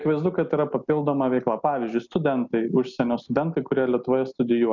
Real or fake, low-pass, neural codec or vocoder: real; 7.2 kHz; none